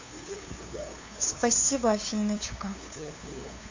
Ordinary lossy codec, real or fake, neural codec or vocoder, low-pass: AAC, 48 kbps; fake; codec, 16 kHz, 2 kbps, FunCodec, trained on LibriTTS, 25 frames a second; 7.2 kHz